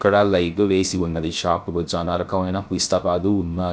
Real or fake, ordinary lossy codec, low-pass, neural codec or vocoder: fake; none; none; codec, 16 kHz, 0.3 kbps, FocalCodec